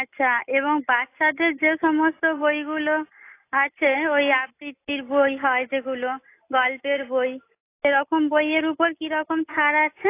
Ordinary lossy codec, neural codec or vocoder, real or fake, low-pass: AAC, 24 kbps; none; real; 3.6 kHz